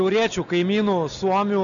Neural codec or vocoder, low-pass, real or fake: none; 7.2 kHz; real